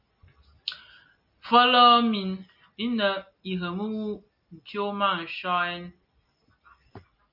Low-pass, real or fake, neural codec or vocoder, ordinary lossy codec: 5.4 kHz; real; none; MP3, 48 kbps